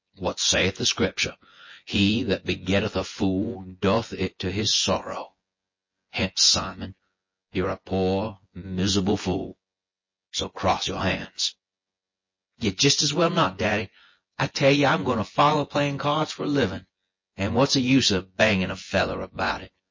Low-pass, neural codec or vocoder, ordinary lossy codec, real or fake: 7.2 kHz; vocoder, 24 kHz, 100 mel bands, Vocos; MP3, 32 kbps; fake